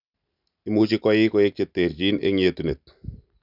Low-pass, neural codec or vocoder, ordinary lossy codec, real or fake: 5.4 kHz; none; none; real